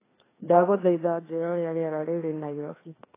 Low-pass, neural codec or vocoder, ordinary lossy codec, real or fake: 3.6 kHz; codec, 16 kHz, 2 kbps, FreqCodec, larger model; AAC, 16 kbps; fake